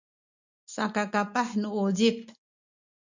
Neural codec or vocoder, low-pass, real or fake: none; 7.2 kHz; real